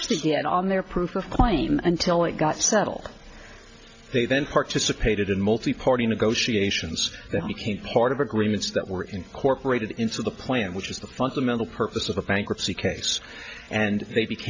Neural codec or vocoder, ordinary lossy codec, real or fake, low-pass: none; AAC, 48 kbps; real; 7.2 kHz